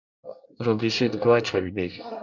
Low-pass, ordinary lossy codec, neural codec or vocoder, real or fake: 7.2 kHz; MP3, 64 kbps; codec, 24 kHz, 1 kbps, SNAC; fake